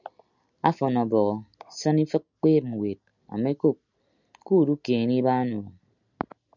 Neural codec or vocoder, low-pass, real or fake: none; 7.2 kHz; real